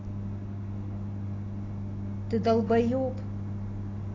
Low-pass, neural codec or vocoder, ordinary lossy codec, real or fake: 7.2 kHz; none; AAC, 32 kbps; real